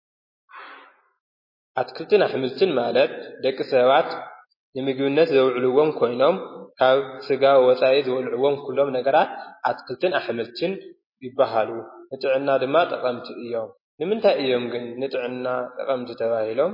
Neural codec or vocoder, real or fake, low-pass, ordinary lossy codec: none; real; 5.4 kHz; MP3, 24 kbps